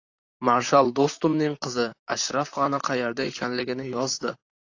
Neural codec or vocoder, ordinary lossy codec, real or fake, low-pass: vocoder, 44.1 kHz, 128 mel bands, Pupu-Vocoder; AAC, 48 kbps; fake; 7.2 kHz